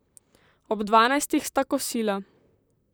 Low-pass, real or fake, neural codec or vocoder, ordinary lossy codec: none; fake; vocoder, 44.1 kHz, 128 mel bands, Pupu-Vocoder; none